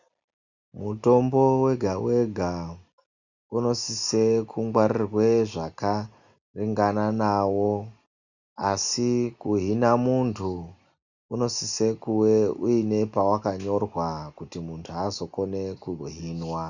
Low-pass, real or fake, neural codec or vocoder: 7.2 kHz; real; none